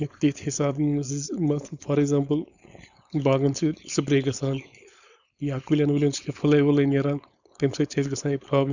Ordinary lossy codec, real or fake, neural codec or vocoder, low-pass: none; fake; codec, 16 kHz, 4.8 kbps, FACodec; 7.2 kHz